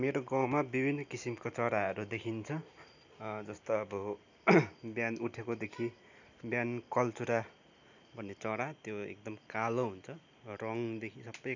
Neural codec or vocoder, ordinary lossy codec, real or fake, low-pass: none; none; real; 7.2 kHz